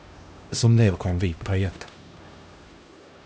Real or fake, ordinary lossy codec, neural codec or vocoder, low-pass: fake; none; codec, 16 kHz, 1 kbps, X-Codec, HuBERT features, trained on LibriSpeech; none